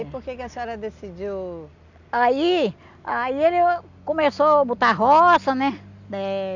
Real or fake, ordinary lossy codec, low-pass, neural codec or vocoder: real; none; 7.2 kHz; none